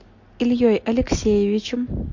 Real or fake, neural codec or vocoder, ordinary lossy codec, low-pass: real; none; MP3, 48 kbps; 7.2 kHz